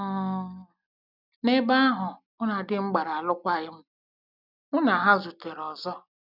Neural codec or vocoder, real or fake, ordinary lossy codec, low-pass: none; real; none; 5.4 kHz